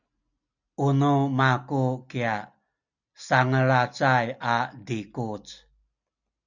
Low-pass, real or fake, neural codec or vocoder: 7.2 kHz; real; none